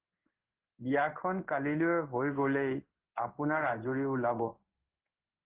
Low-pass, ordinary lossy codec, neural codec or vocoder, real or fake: 3.6 kHz; Opus, 16 kbps; codec, 16 kHz in and 24 kHz out, 1 kbps, XY-Tokenizer; fake